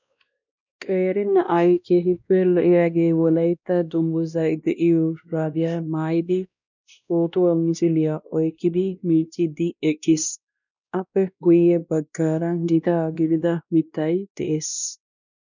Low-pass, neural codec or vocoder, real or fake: 7.2 kHz; codec, 16 kHz, 1 kbps, X-Codec, WavLM features, trained on Multilingual LibriSpeech; fake